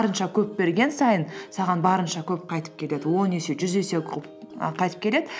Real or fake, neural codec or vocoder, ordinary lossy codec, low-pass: real; none; none; none